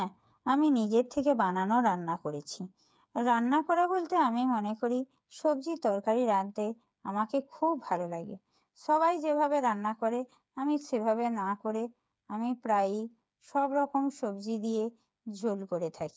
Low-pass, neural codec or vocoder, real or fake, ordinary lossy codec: none; codec, 16 kHz, 8 kbps, FreqCodec, smaller model; fake; none